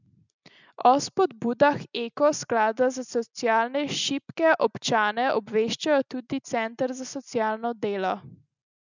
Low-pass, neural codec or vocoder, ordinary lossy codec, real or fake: 7.2 kHz; none; none; real